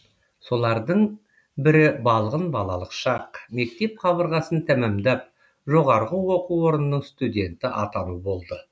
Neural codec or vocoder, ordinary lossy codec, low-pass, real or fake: none; none; none; real